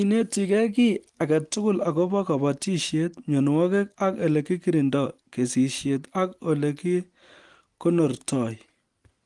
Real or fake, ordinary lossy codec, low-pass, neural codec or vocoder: real; Opus, 24 kbps; 10.8 kHz; none